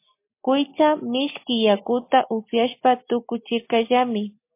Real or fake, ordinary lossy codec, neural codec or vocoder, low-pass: real; MP3, 24 kbps; none; 3.6 kHz